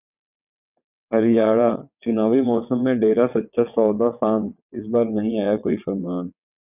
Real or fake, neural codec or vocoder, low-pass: fake; vocoder, 22.05 kHz, 80 mel bands, WaveNeXt; 3.6 kHz